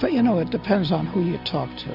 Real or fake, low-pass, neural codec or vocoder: real; 5.4 kHz; none